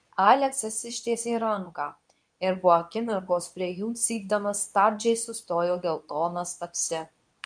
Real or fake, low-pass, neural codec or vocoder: fake; 9.9 kHz; codec, 24 kHz, 0.9 kbps, WavTokenizer, medium speech release version 2